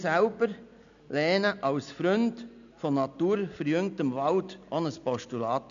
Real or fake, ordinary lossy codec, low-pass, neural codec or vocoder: real; AAC, 96 kbps; 7.2 kHz; none